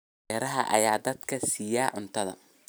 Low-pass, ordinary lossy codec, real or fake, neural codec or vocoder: none; none; real; none